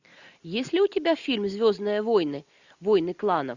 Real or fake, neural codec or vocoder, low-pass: real; none; 7.2 kHz